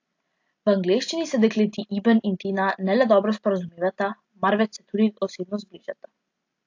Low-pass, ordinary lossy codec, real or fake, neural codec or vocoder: 7.2 kHz; AAC, 48 kbps; real; none